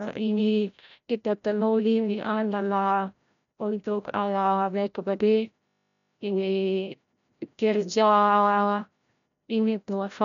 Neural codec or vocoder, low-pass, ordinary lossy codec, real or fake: codec, 16 kHz, 0.5 kbps, FreqCodec, larger model; 7.2 kHz; none; fake